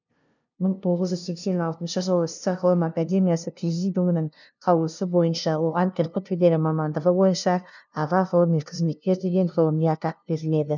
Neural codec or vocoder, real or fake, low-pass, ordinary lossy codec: codec, 16 kHz, 0.5 kbps, FunCodec, trained on LibriTTS, 25 frames a second; fake; 7.2 kHz; none